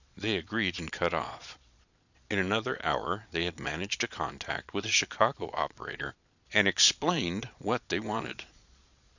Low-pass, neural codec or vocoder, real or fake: 7.2 kHz; vocoder, 22.05 kHz, 80 mel bands, Vocos; fake